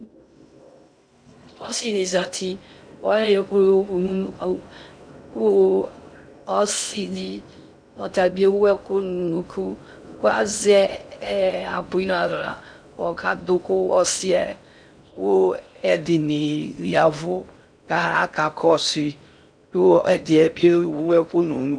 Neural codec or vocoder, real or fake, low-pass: codec, 16 kHz in and 24 kHz out, 0.6 kbps, FocalCodec, streaming, 2048 codes; fake; 9.9 kHz